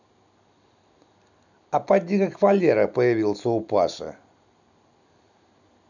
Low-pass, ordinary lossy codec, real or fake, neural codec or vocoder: 7.2 kHz; none; real; none